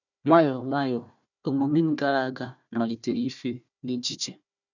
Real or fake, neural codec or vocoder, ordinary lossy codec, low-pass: fake; codec, 16 kHz, 1 kbps, FunCodec, trained on Chinese and English, 50 frames a second; none; 7.2 kHz